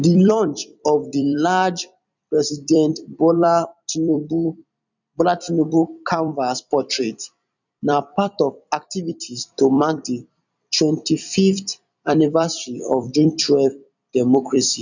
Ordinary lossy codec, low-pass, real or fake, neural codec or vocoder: none; 7.2 kHz; fake; vocoder, 44.1 kHz, 128 mel bands every 256 samples, BigVGAN v2